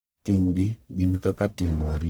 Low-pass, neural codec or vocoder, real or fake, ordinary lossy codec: none; codec, 44.1 kHz, 1.7 kbps, Pupu-Codec; fake; none